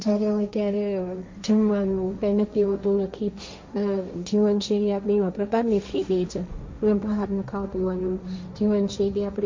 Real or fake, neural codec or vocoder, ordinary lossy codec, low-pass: fake; codec, 16 kHz, 1.1 kbps, Voila-Tokenizer; MP3, 48 kbps; 7.2 kHz